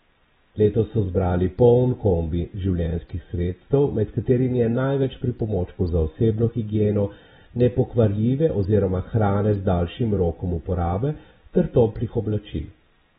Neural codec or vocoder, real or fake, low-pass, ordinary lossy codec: none; real; 19.8 kHz; AAC, 16 kbps